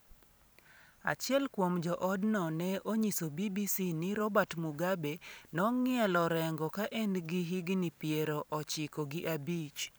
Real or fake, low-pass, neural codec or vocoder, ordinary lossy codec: fake; none; vocoder, 44.1 kHz, 128 mel bands every 512 samples, BigVGAN v2; none